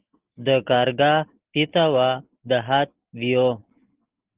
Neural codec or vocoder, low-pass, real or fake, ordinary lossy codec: none; 3.6 kHz; real; Opus, 16 kbps